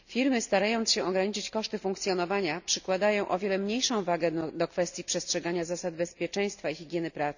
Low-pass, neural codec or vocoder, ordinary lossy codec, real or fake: 7.2 kHz; none; none; real